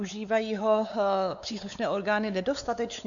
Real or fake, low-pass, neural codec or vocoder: fake; 7.2 kHz; codec, 16 kHz, 4 kbps, X-Codec, WavLM features, trained on Multilingual LibriSpeech